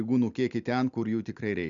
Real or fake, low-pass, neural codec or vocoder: real; 7.2 kHz; none